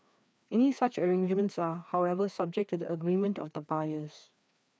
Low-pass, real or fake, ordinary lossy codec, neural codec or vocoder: none; fake; none; codec, 16 kHz, 2 kbps, FreqCodec, larger model